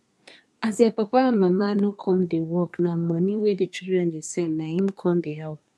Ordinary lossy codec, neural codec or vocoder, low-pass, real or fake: none; codec, 24 kHz, 1 kbps, SNAC; none; fake